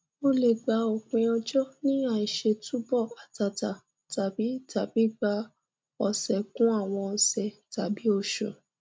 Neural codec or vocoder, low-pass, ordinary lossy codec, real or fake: none; none; none; real